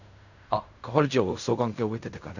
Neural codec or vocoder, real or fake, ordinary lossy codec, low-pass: codec, 16 kHz in and 24 kHz out, 0.4 kbps, LongCat-Audio-Codec, fine tuned four codebook decoder; fake; none; 7.2 kHz